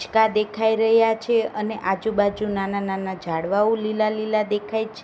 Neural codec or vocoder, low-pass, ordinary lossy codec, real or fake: none; none; none; real